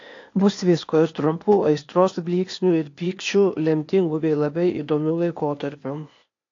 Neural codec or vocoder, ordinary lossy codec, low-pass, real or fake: codec, 16 kHz, 0.8 kbps, ZipCodec; AAC, 48 kbps; 7.2 kHz; fake